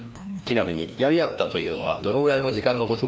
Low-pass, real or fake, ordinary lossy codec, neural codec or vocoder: none; fake; none; codec, 16 kHz, 1 kbps, FreqCodec, larger model